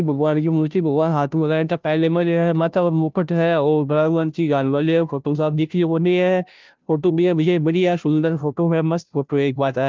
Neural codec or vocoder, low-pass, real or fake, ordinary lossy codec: codec, 16 kHz, 0.5 kbps, FunCodec, trained on Chinese and English, 25 frames a second; 7.2 kHz; fake; Opus, 24 kbps